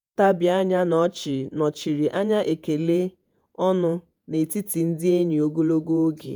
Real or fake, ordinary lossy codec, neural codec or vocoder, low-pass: fake; none; vocoder, 48 kHz, 128 mel bands, Vocos; none